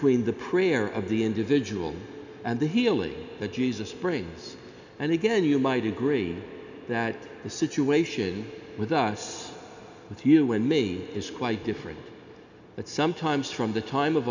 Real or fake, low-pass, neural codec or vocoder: real; 7.2 kHz; none